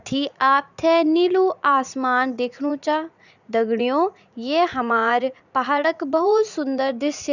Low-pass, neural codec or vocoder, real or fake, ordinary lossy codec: 7.2 kHz; vocoder, 44.1 kHz, 80 mel bands, Vocos; fake; none